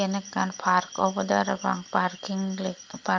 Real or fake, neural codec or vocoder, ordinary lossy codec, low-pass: real; none; Opus, 32 kbps; 7.2 kHz